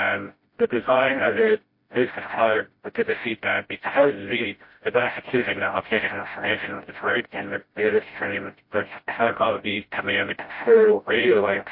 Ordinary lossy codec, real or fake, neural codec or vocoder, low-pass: MP3, 32 kbps; fake; codec, 16 kHz, 0.5 kbps, FreqCodec, smaller model; 5.4 kHz